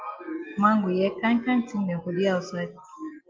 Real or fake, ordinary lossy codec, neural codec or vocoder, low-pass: real; Opus, 24 kbps; none; 7.2 kHz